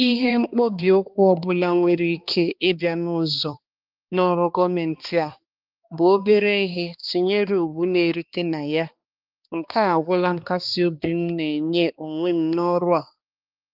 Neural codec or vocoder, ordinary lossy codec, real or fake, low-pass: codec, 16 kHz, 2 kbps, X-Codec, HuBERT features, trained on balanced general audio; Opus, 24 kbps; fake; 5.4 kHz